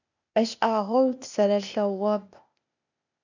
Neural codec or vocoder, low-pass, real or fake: codec, 16 kHz, 0.8 kbps, ZipCodec; 7.2 kHz; fake